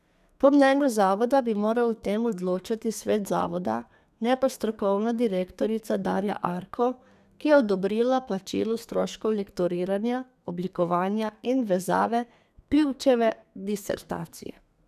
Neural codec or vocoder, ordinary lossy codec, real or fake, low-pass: codec, 32 kHz, 1.9 kbps, SNAC; none; fake; 14.4 kHz